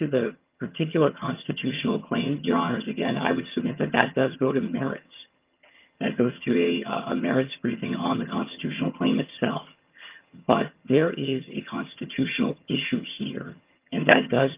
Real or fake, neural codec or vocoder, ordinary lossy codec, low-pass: fake; vocoder, 22.05 kHz, 80 mel bands, HiFi-GAN; Opus, 24 kbps; 3.6 kHz